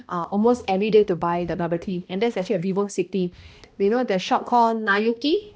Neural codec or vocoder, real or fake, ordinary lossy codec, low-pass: codec, 16 kHz, 1 kbps, X-Codec, HuBERT features, trained on balanced general audio; fake; none; none